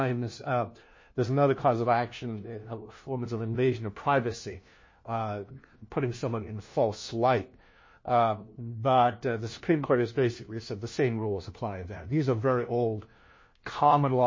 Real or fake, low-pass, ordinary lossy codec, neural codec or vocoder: fake; 7.2 kHz; MP3, 32 kbps; codec, 16 kHz, 1 kbps, FunCodec, trained on LibriTTS, 50 frames a second